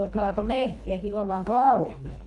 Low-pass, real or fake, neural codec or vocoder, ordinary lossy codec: none; fake; codec, 24 kHz, 1.5 kbps, HILCodec; none